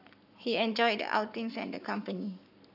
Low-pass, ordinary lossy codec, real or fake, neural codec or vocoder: 5.4 kHz; none; fake; codec, 44.1 kHz, 7.8 kbps, Pupu-Codec